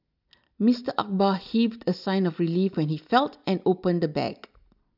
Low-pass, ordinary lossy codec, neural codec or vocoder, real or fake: 5.4 kHz; none; none; real